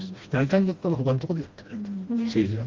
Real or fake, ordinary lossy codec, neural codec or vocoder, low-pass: fake; Opus, 32 kbps; codec, 16 kHz, 1 kbps, FreqCodec, smaller model; 7.2 kHz